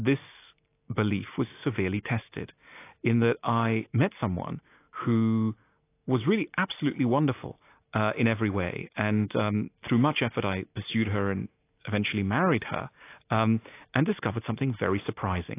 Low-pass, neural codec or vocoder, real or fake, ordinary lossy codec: 3.6 kHz; none; real; AAC, 24 kbps